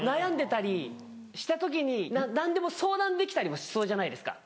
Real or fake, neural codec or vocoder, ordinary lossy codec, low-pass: real; none; none; none